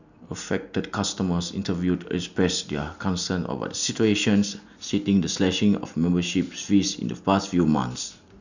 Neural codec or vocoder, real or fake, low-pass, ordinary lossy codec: none; real; 7.2 kHz; none